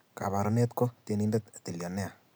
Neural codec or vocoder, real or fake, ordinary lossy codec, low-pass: none; real; none; none